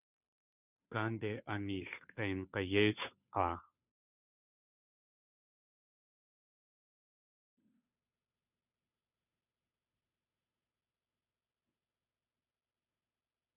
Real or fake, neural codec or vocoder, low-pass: fake; codec, 16 kHz, 2 kbps, FunCodec, trained on Chinese and English, 25 frames a second; 3.6 kHz